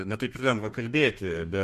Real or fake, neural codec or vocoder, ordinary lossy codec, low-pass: fake; codec, 32 kHz, 1.9 kbps, SNAC; MP3, 64 kbps; 14.4 kHz